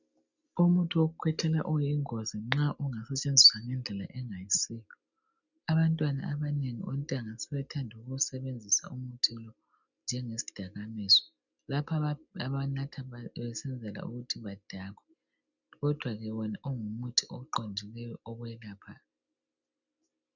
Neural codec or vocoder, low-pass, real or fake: none; 7.2 kHz; real